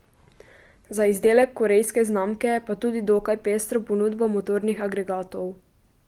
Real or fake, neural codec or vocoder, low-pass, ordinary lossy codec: real; none; 19.8 kHz; Opus, 24 kbps